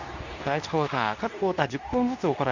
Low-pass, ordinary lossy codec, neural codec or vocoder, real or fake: 7.2 kHz; none; codec, 24 kHz, 0.9 kbps, WavTokenizer, medium speech release version 2; fake